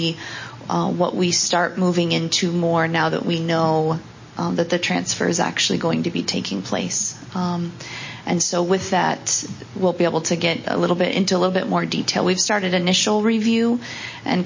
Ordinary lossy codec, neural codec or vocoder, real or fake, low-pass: MP3, 32 kbps; none; real; 7.2 kHz